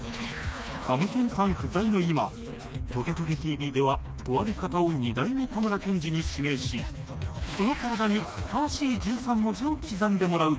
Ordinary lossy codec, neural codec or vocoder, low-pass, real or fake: none; codec, 16 kHz, 2 kbps, FreqCodec, smaller model; none; fake